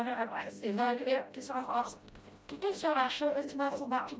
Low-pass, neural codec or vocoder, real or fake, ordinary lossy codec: none; codec, 16 kHz, 0.5 kbps, FreqCodec, smaller model; fake; none